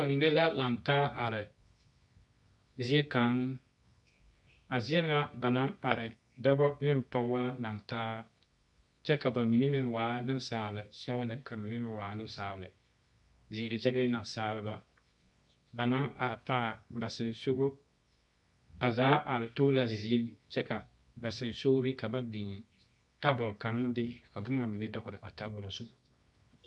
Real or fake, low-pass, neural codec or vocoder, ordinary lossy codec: fake; 10.8 kHz; codec, 24 kHz, 0.9 kbps, WavTokenizer, medium music audio release; MP3, 64 kbps